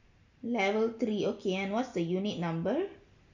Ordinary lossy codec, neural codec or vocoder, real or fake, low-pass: none; none; real; 7.2 kHz